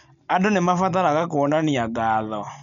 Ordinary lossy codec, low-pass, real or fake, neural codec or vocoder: none; 7.2 kHz; real; none